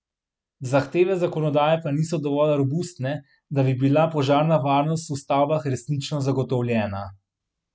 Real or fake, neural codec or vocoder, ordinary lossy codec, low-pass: real; none; none; none